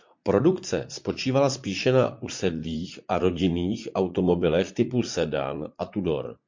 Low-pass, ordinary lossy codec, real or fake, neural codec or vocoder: 7.2 kHz; MP3, 48 kbps; fake; vocoder, 44.1 kHz, 80 mel bands, Vocos